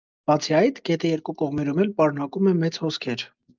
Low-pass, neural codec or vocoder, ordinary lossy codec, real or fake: 7.2 kHz; none; Opus, 24 kbps; real